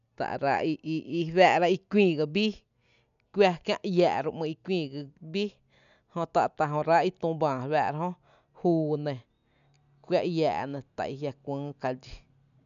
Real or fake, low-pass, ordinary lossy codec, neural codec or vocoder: real; 7.2 kHz; none; none